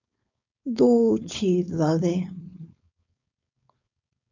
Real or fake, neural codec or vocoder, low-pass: fake; codec, 16 kHz, 4.8 kbps, FACodec; 7.2 kHz